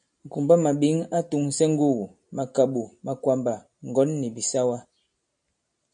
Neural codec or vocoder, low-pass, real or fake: none; 9.9 kHz; real